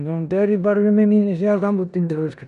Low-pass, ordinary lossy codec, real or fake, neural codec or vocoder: 10.8 kHz; none; fake; codec, 16 kHz in and 24 kHz out, 0.9 kbps, LongCat-Audio-Codec, four codebook decoder